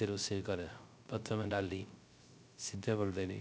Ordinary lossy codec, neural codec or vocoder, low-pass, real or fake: none; codec, 16 kHz, 0.3 kbps, FocalCodec; none; fake